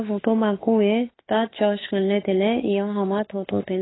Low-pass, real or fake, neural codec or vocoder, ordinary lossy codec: 7.2 kHz; fake; autoencoder, 48 kHz, 32 numbers a frame, DAC-VAE, trained on Japanese speech; AAC, 16 kbps